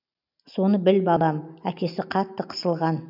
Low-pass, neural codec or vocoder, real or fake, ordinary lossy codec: 5.4 kHz; none; real; none